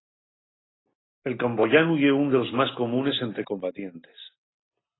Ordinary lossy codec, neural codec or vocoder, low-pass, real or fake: AAC, 16 kbps; none; 7.2 kHz; real